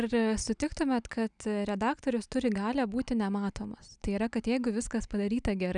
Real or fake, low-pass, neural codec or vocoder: real; 9.9 kHz; none